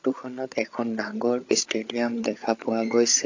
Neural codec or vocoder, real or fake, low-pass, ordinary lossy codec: codec, 16 kHz, 8 kbps, FreqCodec, larger model; fake; 7.2 kHz; AAC, 48 kbps